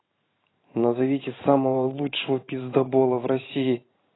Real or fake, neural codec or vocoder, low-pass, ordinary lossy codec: fake; vocoder, 44.1 kHz, 128 mel bands every 512 samples, BigVGAN v2; 7.2 kHz; AAC, 16 kbps